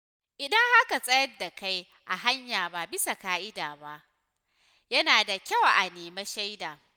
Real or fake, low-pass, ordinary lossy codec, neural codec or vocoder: real; none; none; none